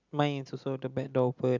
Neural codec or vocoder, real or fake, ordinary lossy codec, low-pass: none; real; none; 7.2 kHz